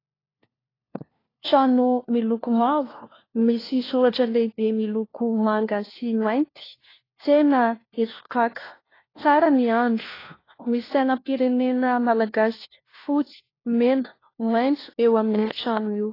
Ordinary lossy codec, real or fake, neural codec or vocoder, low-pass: AAC, 24 kbps; fake; codec, 16 kHz, 1 kbps, FunCodec, trained on LibriTTS, 50 frames a second; 5.4 kHz